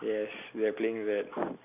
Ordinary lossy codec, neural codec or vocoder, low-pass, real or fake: MP3, 32 kbps; none; 3.6 kHz; real